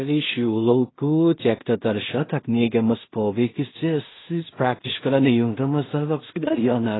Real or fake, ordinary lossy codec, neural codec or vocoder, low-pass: fake; AAC, 16 kbps; codec, 16 kHz in and 24 kHz out, 0.4 kbps, LongCat-Audio-Codec, two codebook decoder; 7.2 kHz